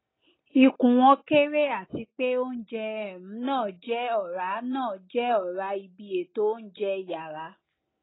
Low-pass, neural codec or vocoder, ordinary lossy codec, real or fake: 7.2 kHz; none; AAC, 16 kbps; real